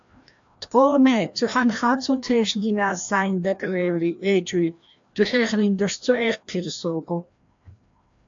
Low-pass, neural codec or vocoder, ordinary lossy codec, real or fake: 7.2 kHz; codec, 16 kHz, 1 kbps, FreqCodec, larger model; AAC, 64 kbps; fake